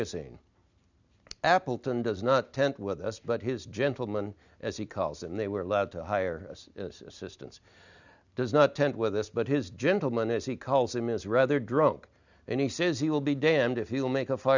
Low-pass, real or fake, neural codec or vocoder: 7.2 kHz; real; none